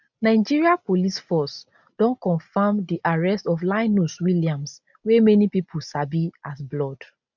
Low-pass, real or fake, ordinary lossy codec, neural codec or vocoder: 7.2 kHz; real; none; none